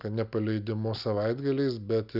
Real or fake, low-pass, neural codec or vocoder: real; 5.4 kHz; none